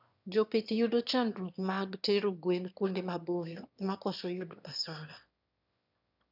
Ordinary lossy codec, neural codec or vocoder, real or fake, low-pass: none; autoencoder, 22.05 kHz, a latent of 192 numbers a frame, VITS, trained on one speaker; fake; 5.4 kHz